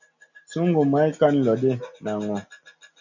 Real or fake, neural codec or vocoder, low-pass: real; none; 7.2 kHz